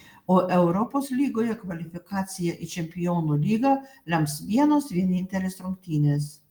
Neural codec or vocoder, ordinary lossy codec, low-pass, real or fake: none; Opus, 24 kbps; 19.8 kHz; real